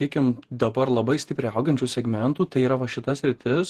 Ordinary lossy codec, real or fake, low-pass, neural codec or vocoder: Opus, 16 kbps; fake; 14.4 kHz; vocoder, 48 kHz, 128 mel bands, Vocos